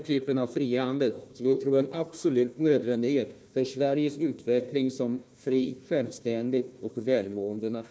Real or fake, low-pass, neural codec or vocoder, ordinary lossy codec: fake; none; codec, 16 kHz, 1 kbps, FunCodec, trained on Chinese and English, 50 frames a second; none